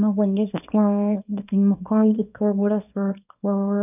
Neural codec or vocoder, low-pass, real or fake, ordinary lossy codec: codec, 24 kHz, 0.9 kbps, WavTokenizer, small release; 3.6 kHz; fake; none